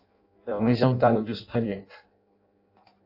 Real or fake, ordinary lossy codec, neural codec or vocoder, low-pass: fake; AAC, 48 kbps; codec, 16 kHz in and 24 kHz out, 0.6 kbps, FireRedTTS-2 codec; 5.4 kHz